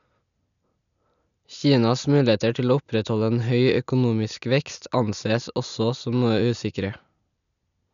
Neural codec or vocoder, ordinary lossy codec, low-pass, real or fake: none; none; 7.2 kHz; real